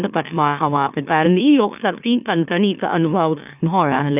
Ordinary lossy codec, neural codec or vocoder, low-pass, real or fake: none; autoencoder, 44.1 kHz, a latent of 192 numbers a frame, MeloTTS; 3.6 kHz; fake